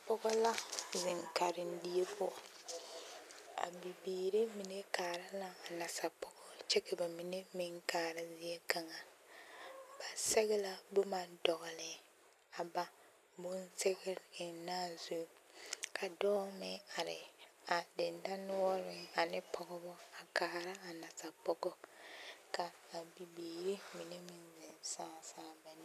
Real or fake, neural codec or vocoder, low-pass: real; none; 14.4 kHz